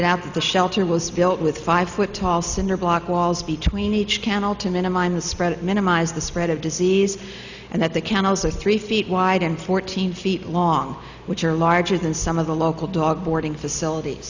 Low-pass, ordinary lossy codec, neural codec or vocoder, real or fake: 7.2 kHz; Opus, 64 kbps; none; real